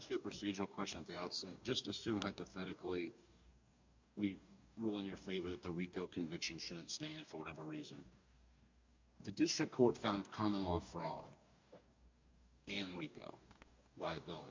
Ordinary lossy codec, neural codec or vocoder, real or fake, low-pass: MP3, 64 kbps; codec, 44.1 kHz, 2.6 kbps, DAC; fake; 7.2 kHz